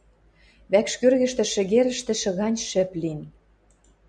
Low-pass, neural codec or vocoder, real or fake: 9.9 kHz; none; real